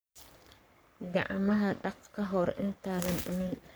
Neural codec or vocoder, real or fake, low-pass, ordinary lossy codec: codec, 44.1 kHz, 3.4 kbps, Pupu-Codec; fake; none; none